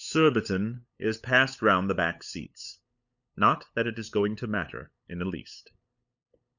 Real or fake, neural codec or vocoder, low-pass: fake; codec, 16 kHz, 8 kbps, FunCodec, trained on LibriTTS, 25 frames a second; 7.2 kHz